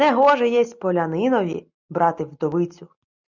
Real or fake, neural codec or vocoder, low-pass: real; none; 7.2 kHz